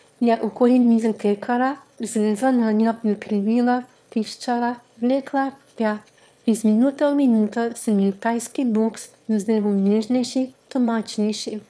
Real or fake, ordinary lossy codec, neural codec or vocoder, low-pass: fake; none; autoencoder, 22.05 kHz, a latent of 192 numbers a frame, VITS, trained on one speaker; none